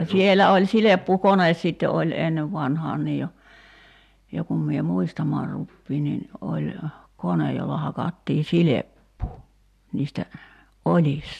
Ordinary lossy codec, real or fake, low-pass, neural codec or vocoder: AAC, 96 kbps; real; 14.4 kHz; none